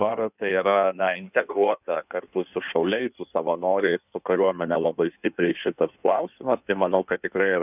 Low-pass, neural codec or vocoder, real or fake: 3.6 kHz; codec, 16 kHz in and 24 kHz out, 1.1 kbps, FireRedTTS-2 codec; fake